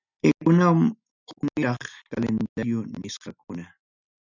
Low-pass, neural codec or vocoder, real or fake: 7.2 kHz; none; real